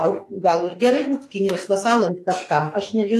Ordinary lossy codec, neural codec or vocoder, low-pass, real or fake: AAC, 48 kbps; autoencoder, 48 kHz, 32 numbers a frame, DAC-VAE, trained on Japanese speech; 14.4 kHz; fake